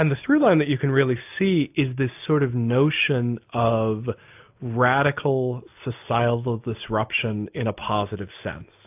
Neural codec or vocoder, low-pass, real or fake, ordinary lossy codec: none; 3.6 kHz; real; AAC, 32 kbps